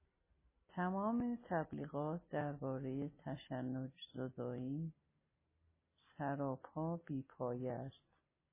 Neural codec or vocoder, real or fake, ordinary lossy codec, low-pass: none; real; MP3, 16 kbps; 3.6 kHz